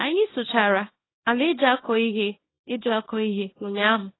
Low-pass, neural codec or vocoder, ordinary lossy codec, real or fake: 7.2 kHz; codec, 24 kHz, 0.9 kbps, WavTokenizer, small release; AAC, 16 kbps; fake